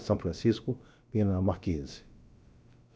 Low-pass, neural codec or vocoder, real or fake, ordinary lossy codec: none; codec, 16 kHz, about 1 kbps, DyCAST, with the encoder's durations; fake; none